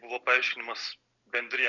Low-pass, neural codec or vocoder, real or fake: 7.2 kHz; none; real